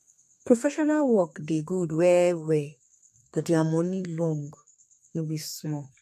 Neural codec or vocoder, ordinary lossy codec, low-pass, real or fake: codec, 32 kHz, 1.9 kbps, SNAC; MP3, 64 kbps; 14.4 kHz; fake